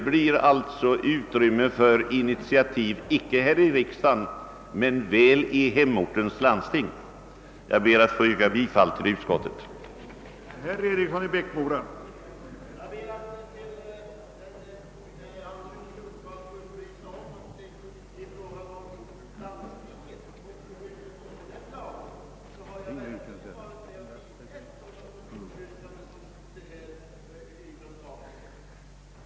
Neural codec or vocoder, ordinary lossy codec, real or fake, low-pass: none; none; real; none